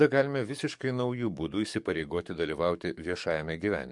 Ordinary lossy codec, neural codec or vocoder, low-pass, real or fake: MP3, 64 kbps; codec, 44.1 kHz, 7.8 kbps, DAC; 10.8 kHz; fake